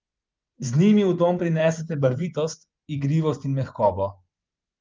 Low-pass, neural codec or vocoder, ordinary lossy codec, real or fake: 7.2 kHz; vocoder, 24 kHz, 100 mel bands, Vocos; Opus, 32 kbps; fake